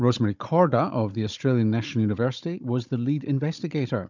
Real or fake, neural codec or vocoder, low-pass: fake; codec, 16 kHz, 16 kbps, FunCodec, trained on Chinese and English, 50 frames a second; 7.2 kHz